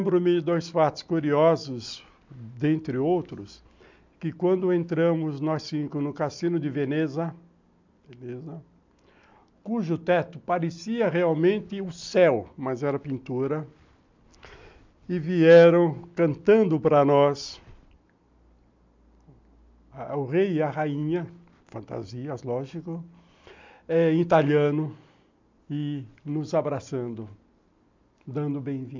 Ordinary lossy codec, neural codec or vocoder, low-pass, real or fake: MP3, 64 kbps; none; 7.2 kHz; real